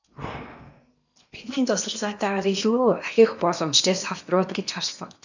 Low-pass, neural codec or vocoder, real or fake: 7.2 kHz; codec, 16 kHz in and 24 kHz out, 0.8 kbps, FocalCodec, streaming, 65536 codes; fake